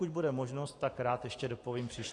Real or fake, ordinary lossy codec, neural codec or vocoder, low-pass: real; AAC, 48 kbps; none; 10.8 kHz